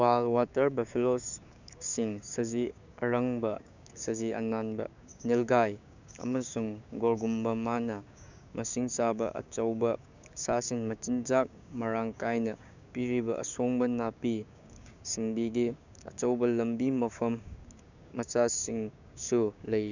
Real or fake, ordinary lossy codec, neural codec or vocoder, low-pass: fake; none; codec, 16 kHz, 6 kbps, DAC; 7.2 kHz